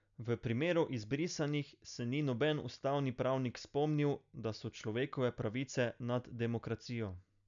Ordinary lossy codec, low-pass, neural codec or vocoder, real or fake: none; 7.2 kHz; none; real